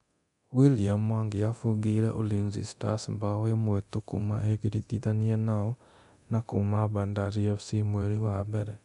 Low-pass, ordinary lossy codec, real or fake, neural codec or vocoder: 10.8 kHz; none; fake; codec, 24 kHz, 0.9 kbps, DualCodec